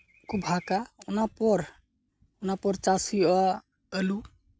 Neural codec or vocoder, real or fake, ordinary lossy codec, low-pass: none; real; none; none